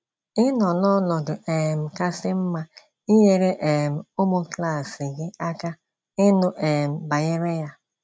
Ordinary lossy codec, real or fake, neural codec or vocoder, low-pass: none; real; none; none